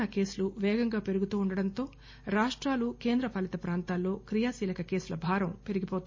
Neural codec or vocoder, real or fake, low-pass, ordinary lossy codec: none; real; 7.2 kHz; MP3, 32 kbps